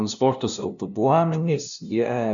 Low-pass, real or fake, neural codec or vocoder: 7.2 kHz; fake; codec, 16 kHz, 0.5 kbps, FunCodec, trained on LibriTTS, 25 frames a second